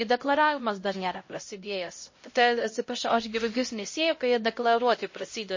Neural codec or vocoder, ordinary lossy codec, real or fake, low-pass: codec, 16 kHz, 0.5 kbps, X-Codec, HuBERT features, trained on LibriSpeech; MP3, 32 kbps; fake; 7.2 kHz